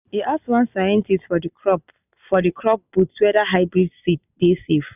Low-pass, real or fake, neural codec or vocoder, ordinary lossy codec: 3.6 kHz; real; none; none